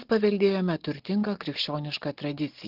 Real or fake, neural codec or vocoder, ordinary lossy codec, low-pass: real; none; Opus, 16 kbps; 5.4 kHz